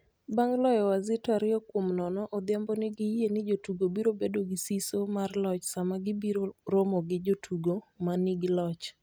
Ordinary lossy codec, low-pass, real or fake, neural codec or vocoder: none; none; real; none